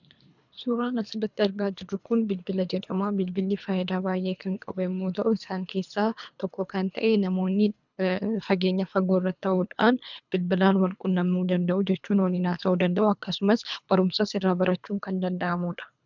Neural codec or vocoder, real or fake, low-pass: codec, 24 kHz, 3 kbps, HILCodec; fake; 7.2 kHz